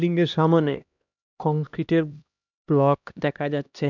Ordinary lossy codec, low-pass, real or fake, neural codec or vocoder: none; 7.2 kHz; fake; codec, 16 kHz, 1 kbps, X-Codec, HuBERT features, trained on LibriSpeech